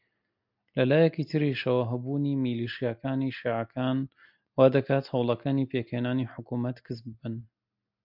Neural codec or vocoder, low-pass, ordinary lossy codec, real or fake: none; 5.4 kHz; MP3, 48 kbps; real